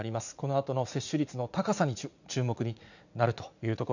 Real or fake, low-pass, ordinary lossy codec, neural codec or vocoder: fake; 7.2 kHz; none; vocoder, 44.1 kHz, 80 mel bands, Vocos